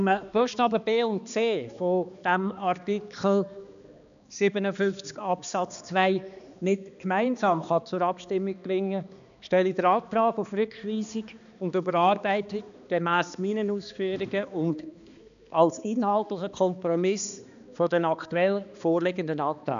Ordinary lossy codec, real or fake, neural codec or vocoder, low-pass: none; fake; codec, 16 kHz, 2 kbps, X-Codec, HuBERT features, trained on balanced general audio; 7.2 kHz